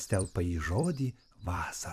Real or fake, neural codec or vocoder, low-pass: fake; vocoder, 44.1 kHz, 128 mel bands every 256 samples, BigVGAN v2; 14.4 kHz